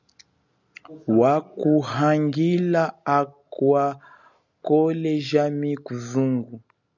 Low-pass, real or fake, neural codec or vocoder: 7.2 kHz; real; none